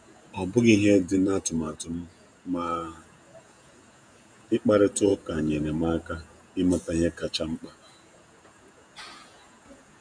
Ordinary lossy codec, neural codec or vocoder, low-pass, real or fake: none; vocoder, 48 kHz, 128 mel bands, Vocos; 9.9 kHz; fake